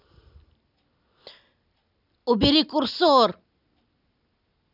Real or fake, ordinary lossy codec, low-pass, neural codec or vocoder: real; none; 5.4 kHz; none